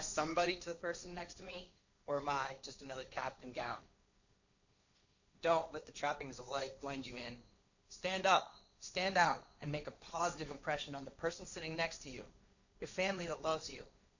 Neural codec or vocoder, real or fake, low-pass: codec, 16 kHz, 1.1 kbps, Voila-Tokenizer; fake; 7.2 kHz